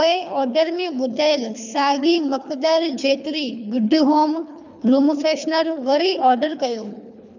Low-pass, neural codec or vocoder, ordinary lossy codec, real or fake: 7.2 kHz; codec, 24 kHz, 3 kbps, HILCodec; none; fake